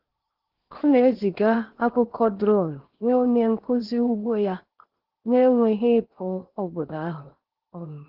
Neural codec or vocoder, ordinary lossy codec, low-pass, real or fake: codec, 16 kHz in and 24 kHz out, 0.8 kbps, FocalCodec, streaming, 65536 codes; Opus, 16 kbps; 5.4 kHz; fake